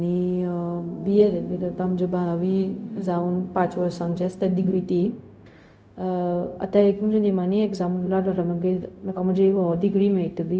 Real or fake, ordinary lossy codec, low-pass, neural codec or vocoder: fake; none; none; codec, 16 kHz, 0.4 kbps, LongCat-Audio-Codec